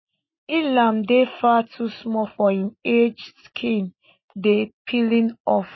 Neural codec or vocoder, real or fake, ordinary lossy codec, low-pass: none; real; MP3, 24 kbps; 7.2 kHz